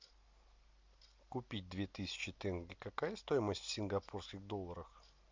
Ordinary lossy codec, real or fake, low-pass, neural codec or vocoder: MP3, 64 kbps; real; 7.2 kHz; none